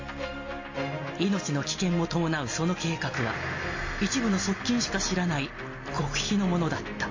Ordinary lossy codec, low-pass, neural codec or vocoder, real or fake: MP3, 32 kbps; 7.2 kHz; none; real